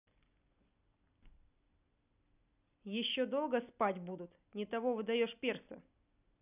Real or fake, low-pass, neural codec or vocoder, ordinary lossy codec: real; 3.6 kHz; none; none